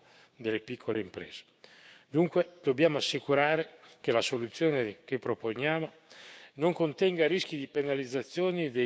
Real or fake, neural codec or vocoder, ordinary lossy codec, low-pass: fake; codec, 16 kHz, 6 kbps, DAC; none; none